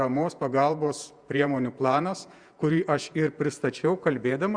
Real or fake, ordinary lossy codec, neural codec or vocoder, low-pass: fake; Opus, 64 kbps; vocoder, 48 kHz, 128 mel bands, Vocos; 9.9 kHz